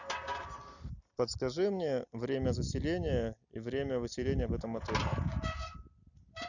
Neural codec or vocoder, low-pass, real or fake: none; 7.2 kHz; real